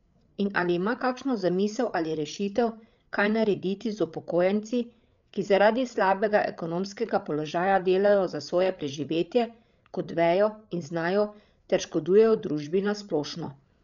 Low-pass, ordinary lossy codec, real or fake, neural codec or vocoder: 7.2 kHz; MP3, 96 kbps; fake; codec, 16 kHz, 8 kbps, FreqCodec, larger model